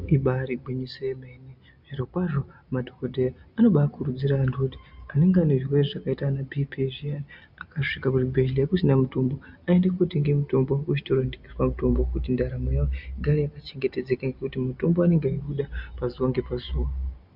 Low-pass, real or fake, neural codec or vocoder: 5.4 kHz; real; none